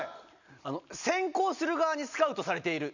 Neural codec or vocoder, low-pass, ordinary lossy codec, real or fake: none; 7.2 kHz; none; real